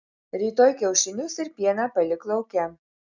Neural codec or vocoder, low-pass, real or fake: none; 7.2 kHz; real